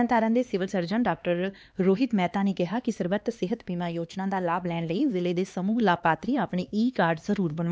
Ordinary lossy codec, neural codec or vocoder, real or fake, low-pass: none; codec, 16 kHz, 2 kbps, X-Codec, HuBERT features, trained on LibriSpeech; fake; none